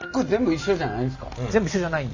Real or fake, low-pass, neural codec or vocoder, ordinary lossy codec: real; 7.2 kHz; none; Opus, 64 kbps